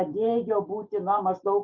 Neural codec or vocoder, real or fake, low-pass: none; real; 7.2 kHz